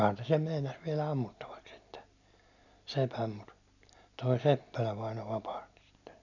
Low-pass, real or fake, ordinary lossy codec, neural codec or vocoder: 7.2 kHz; real; none; none